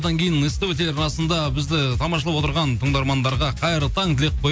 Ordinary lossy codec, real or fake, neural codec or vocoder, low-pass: none; real; none; none